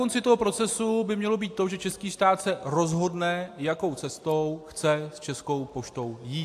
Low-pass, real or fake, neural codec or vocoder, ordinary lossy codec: 14.4 kHz; real; none; AAC, 64 kbps